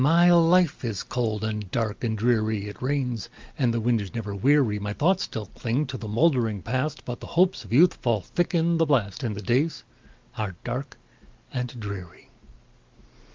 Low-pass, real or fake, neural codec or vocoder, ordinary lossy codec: 7.2 kHz; real; none; Opus, 32 kbps